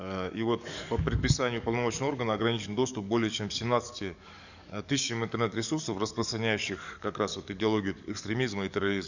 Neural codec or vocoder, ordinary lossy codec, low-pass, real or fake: codec, 44.1 kHz, 7.8 kbps, DAC; none; 7.2 kHz; fake